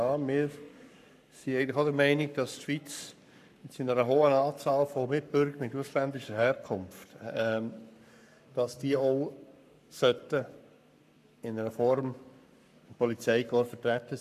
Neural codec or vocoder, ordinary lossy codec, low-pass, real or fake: codec, 44.1 kHz, 7.8 kbps, Pupu-Codec; none; 14.4 kHz; fake